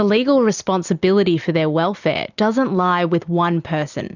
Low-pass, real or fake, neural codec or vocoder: 7.2 kHz; real; none